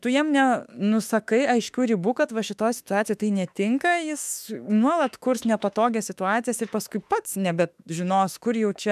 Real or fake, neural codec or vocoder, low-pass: fake; autoencoder, 48 kHz, 32 numbers a frame, DAC-VAE, trained on Japanese speech; 14.4 kHz